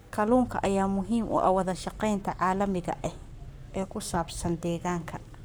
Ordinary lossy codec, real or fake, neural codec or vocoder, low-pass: none; fake; codec, 44.1 kHz, 7.8 kbps, Pupu-Codec; none